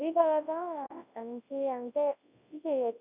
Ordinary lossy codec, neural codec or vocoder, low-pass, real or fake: none; codec, 24 kHz, 0.9 kbps, WavTokenizer, large speech release; 3.6 kHz; fake